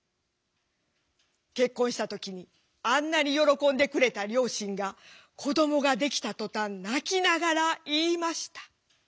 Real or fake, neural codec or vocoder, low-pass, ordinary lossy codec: real; none; none; none